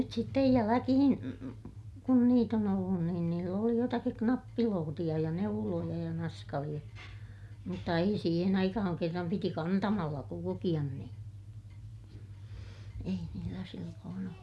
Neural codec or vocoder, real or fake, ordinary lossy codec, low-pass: none; real; none; none